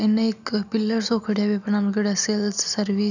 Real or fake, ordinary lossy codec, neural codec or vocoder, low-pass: real; none; none; 7.2 kHz